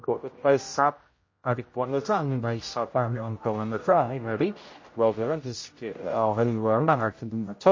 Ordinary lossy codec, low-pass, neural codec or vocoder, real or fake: MP3, 32 kbps; 7.2 kHz; codec, 16 kHz, 0.5 kbps, X-Codec, HuBERT features, trained on general audio; fake